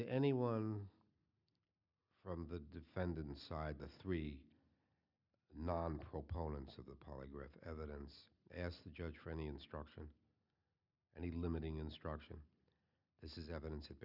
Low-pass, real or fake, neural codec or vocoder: 5.4 kHz; real; none